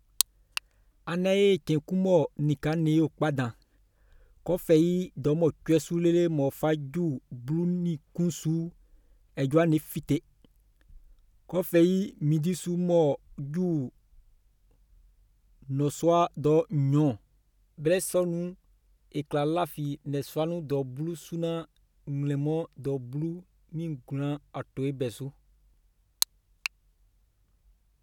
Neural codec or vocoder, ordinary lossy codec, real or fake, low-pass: none; none; real; 19.8 kHz